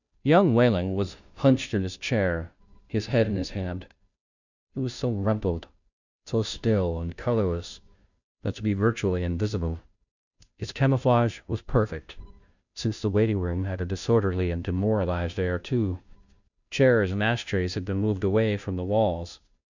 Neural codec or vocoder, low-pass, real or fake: codec, 16 kHz, 0.5 kbps, FunCodec, trained on Chinese and English, 25 frames a second; 7.2 kHz; fake